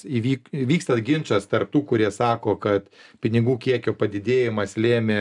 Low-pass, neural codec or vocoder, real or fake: 10.8 kHz; none; real